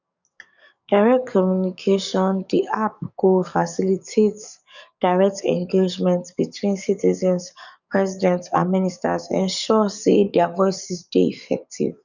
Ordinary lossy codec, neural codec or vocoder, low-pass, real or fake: none; codec, 44.1 kHz, 7.8 kbps, DAC; 7.2 kHz; fake